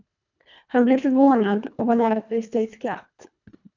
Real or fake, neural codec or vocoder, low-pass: fake; codec, 24 kHz, 1.5 kbps, HILCodec; 7.2 kHz